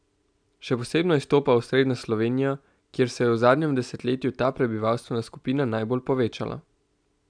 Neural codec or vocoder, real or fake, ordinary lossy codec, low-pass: none; real; none; 9.9 kHz